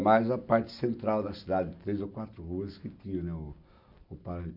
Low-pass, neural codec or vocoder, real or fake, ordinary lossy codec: 5.4 kHz; none; real; none